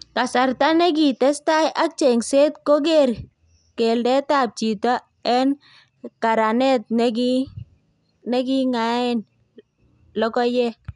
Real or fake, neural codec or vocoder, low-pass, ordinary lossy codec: real; none; 10.8 kHz; none